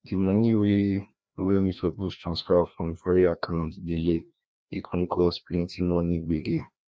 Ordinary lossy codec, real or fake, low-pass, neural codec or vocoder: none; fake; none; codec, 16 kHz, 1 kbps, FreqCodec, larger model